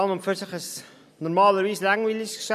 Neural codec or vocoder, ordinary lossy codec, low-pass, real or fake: none; MP3, 96 kbps; 14.4 kHz; real